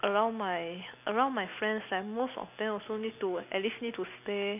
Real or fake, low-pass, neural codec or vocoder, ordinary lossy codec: real; 3.6 kHz; none; none